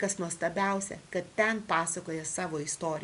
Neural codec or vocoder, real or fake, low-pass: none; real; 10.8 kHz